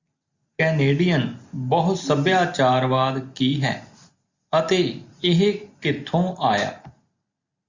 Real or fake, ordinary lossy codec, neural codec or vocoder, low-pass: real; Opus, 64 kbps; none; 7.2 kHz